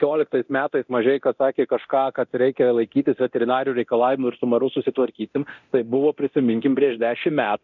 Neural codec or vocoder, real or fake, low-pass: codec, 24 kHz, 0.9 kbps, DualCodec; fake; 7.2 kHz